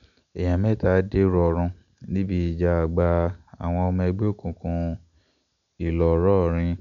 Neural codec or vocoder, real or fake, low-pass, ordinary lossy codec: none; real; 7.2 kHz; none